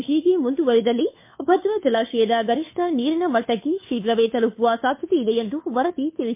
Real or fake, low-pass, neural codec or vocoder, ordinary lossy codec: fake; 3.6 kHz; codec, 16 kHz, 2 kbps, FunCodec, trained on Chinese and English, 25 frames a second; MP3, 24 kbps